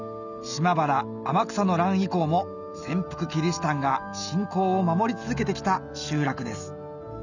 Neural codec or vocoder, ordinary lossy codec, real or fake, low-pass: none; none; real; 7.2 kHz